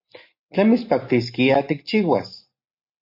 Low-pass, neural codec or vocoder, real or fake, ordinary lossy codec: 5.4 kHz; none; real; MP3, 32 kbps